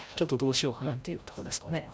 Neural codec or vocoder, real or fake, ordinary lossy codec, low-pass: codec, 16 kHz, 0.5 kbps, FreqCodec, larger model; fake; none; none